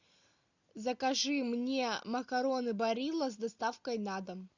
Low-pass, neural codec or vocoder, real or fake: 7.2 kHz; none; real